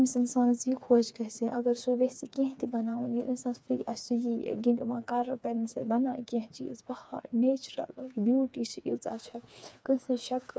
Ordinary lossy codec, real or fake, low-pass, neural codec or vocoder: none; fake; none; codec, 16 kHz, 4 kbps, FreqCodec, smaller model